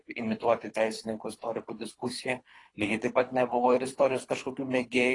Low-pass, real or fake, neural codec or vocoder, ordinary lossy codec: 10.8 kHz; fake; codec, 24 kHz, 3 kbps, HILCodec; AAC, 32 kbps